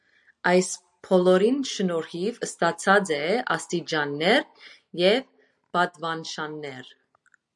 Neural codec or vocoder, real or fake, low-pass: none; real; 10.8 kHz